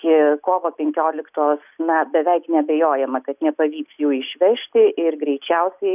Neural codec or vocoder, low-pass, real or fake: none; 3.6 kHz; real